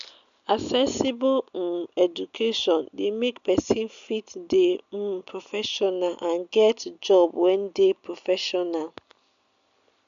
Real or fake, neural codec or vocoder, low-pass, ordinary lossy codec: real; none; 7.2 kHz; MP3, 96 kbps